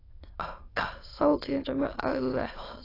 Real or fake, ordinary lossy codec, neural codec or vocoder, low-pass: fake; AAC, 24 kbps; autoencoder, 22.05 kHz, a latent of 192 numbers a frame, VITS, trained on many speakers; 5.4 kHz